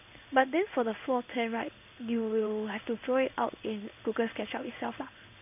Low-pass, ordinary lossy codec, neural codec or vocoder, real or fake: 3.6 kHz; none; codec, 16 kHz in and 24 kHz out, 1 kbps, XY-Tokenizer; fake